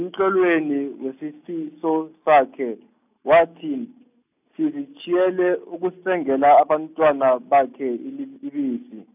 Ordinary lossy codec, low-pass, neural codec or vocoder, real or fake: none; 3.6 kHz; none; real